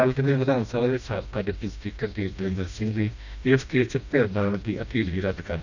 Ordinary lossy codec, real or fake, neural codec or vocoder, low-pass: none; fake; codec, 16 kHz, 1 kbps, FreqCodec, smaller model; 7.2 kHz